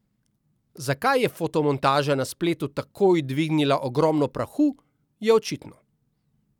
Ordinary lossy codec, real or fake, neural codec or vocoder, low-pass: none; real; none; 19.8 kHz